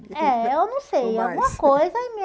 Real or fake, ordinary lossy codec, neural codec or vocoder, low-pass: real; none; none; none